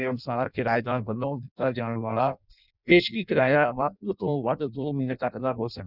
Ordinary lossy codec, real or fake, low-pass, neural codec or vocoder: none; fake; 5.4 kHz; codec, 16 kHz in and 24 kHz out, 0.6 kbps, FireRedTTS-2 codec